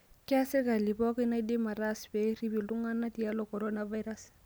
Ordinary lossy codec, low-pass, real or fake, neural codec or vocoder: none; none; real; none